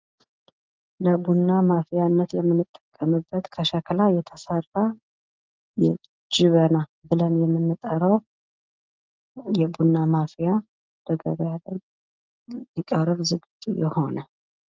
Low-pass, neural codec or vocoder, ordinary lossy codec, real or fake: 7.2 kHz; none; Opus, 24 kbps; real